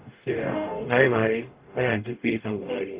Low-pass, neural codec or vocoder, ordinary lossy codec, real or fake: 3.6 kHz; codec, 44.1 kHz, 0.9 kbps, DAC; Opus, 32 kbps; fake